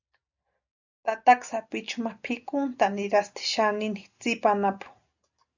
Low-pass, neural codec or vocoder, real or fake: 7.2 kHz; none; real